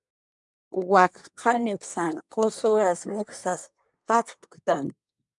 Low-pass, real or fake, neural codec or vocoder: 10.8 kHz; fake; codec, 24 kHz, 1 kbps, SNAC